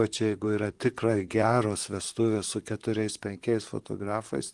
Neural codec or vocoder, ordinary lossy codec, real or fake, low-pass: vocoder, 44.1 kHz, 128 mel bands, Pupu-Vocoder; Opus, 24 kbps; fake; 10.8 kHz